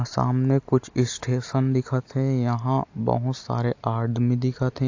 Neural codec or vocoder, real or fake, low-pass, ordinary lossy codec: none; real; 7.2 kHz; AAC, 48 kbps